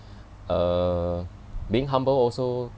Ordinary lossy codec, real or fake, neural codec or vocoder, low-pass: none; real; none; none